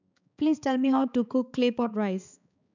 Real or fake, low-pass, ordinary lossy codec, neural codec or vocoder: fake; 7.2 kHz; none; codec, 16 kHz, 4 kbps, X-Codec, HuBERT features, trained on balanced general audio